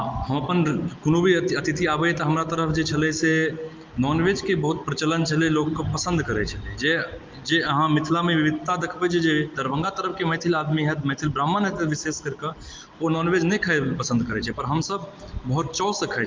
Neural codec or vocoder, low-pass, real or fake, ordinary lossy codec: none; 7.2 kHz; real; Opus, 24 kbps